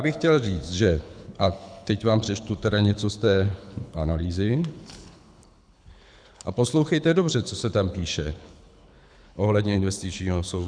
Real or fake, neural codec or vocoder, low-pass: fake; codec, 24 kHz, 6 kbps, HILCodec; 9.9 kHz